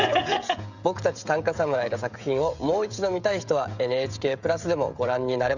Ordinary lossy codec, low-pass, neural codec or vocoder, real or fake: none; 7.2 kHz; codec, 16 kHz, 16 kbps, FreqCodec, smaller model; fake